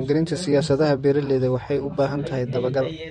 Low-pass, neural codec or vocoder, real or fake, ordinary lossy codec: 19.8 kHz; vocoder, 44.1 kHz, 128 mel bands, Pupu-Vocoder; fake; MP3, 48 kbps